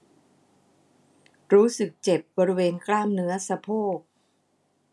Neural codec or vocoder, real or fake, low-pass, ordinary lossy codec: none; real; none; none